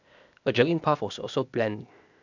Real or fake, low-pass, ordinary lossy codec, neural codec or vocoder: fake; 7.2 kHz; none; codec, 16 kHz, 0.8 kbps, ZipCodec